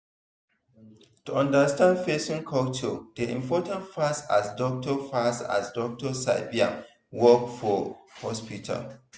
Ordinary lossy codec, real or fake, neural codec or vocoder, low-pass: none; real; none; none